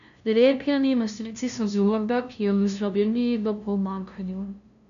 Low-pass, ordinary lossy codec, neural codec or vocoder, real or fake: 7.2 kHz; none; codec, 16 kHz, 0.5 kbps, FunCodec, trained on LibriTTS, 25 frames a second; fake